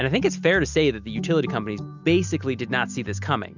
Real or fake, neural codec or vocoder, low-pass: real; none; 7.2 kHz